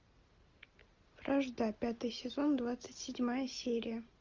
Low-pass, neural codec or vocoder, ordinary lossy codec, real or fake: 7.2 kHz; none; Opus, 24 kbps; real